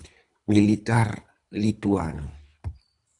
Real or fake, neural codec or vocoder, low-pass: fake; codec, 24 kHz, 3 kbps, HILCodec; 10.8 kHz